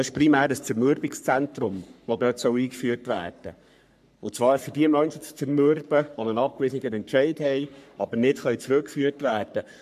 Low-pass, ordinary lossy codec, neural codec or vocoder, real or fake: 14.4 kHz; MP3, 96 kbps; codec, 44.1 kHz, 3.4 kbps, Pupu-Codec; fake